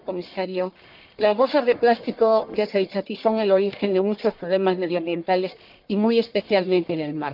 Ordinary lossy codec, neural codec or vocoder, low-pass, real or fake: Opus, 24 kbps; codec, 44.1 kHz, 1.7 kbps, Pupu-Codec; 5.4 kHz; fake